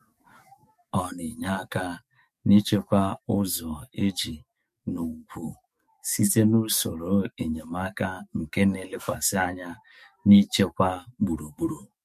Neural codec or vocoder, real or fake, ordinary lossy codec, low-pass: codec, 44.1 kHz, 7.8 kbps, DAC; fake; MP3, 64 kbps; 14.4 kHz